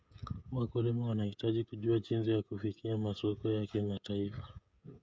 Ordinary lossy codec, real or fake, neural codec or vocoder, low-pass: none; fake; codec, 16 kHz, 16 kbps, FreqCodec, smaller model; none